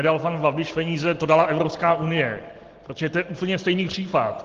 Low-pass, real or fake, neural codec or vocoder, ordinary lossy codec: 7.2 kHz; real; none; Opus, 16 kbps